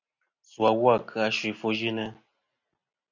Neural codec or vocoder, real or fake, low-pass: none; real; 7.2 kHz